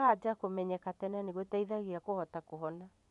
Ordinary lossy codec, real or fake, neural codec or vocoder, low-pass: none; fake; vocoder, 24 kHz, 100 mel bands, Vocos; 10.8 kHz